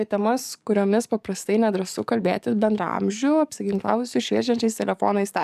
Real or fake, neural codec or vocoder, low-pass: fake; codec, 44.1 kHz, 7.8 kbps, DAC; 14.4 kHz